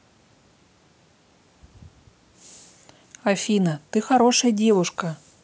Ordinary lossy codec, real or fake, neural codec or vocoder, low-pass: none; real; none; none